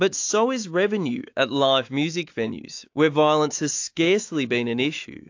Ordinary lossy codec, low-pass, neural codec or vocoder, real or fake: AAC, 48 kbps; 7.2 kHz; autoencoder, 48 kHz, 128 numbers a frame, DAC-VAE, trained on Japanese speech; fake